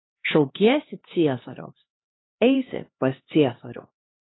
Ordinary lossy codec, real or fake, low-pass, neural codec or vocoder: AAC, 16 kbps; fake; 7.2 kHz; codec, 16 kHz, 2 kbps, X-Codec, HuBERT features, trained on LibriSpeech